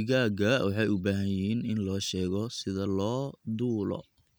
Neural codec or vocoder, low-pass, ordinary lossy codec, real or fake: none; none; none; real